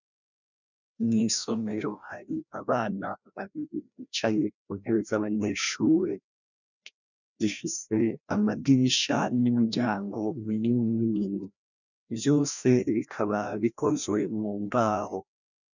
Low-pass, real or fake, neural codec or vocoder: 7.2 kHz; fake; codec, 16 kHz, 1 kbps, FreqCodec, larger model